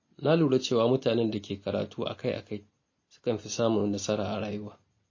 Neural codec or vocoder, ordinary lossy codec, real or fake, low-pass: none; MP3, 32 kbps; real; 7.2 kHz